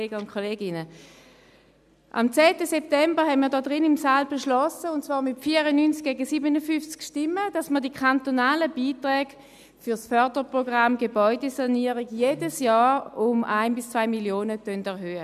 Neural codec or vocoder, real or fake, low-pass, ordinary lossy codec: none; real; 14.4 kHz; none